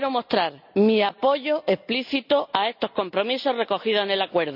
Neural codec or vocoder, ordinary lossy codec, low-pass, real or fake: none; none; 5.4 kHz; real